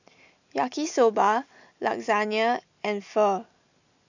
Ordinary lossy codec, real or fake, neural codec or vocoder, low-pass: none; real; none; 7.2 kHz